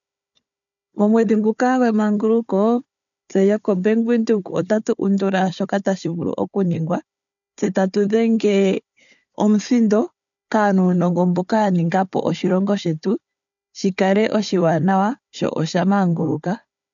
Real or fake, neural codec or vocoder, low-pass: fake; codec, 16 kHz, 4 kbps, FunCodec, trained on Chinese and English, 50 frames a second; 7.2 kHz